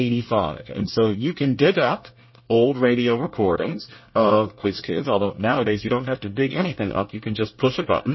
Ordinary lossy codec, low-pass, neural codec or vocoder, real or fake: MP3, 24 kbps; 7.2 kHz; codec, 24 kHz, 1 kbps, SNAC; fake